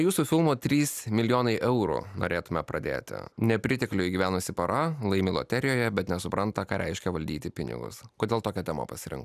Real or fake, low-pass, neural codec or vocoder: real; 14.4 kHz; none